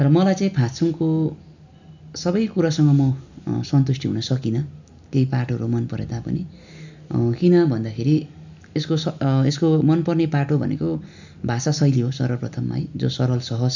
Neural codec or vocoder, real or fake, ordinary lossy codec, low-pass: none; real; none; 7.2 kHz